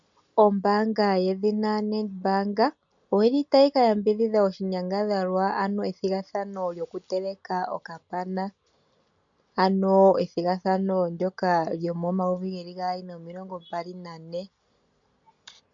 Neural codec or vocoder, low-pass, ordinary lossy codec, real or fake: none; 7.2 kHz; MP3, 64 kbps; real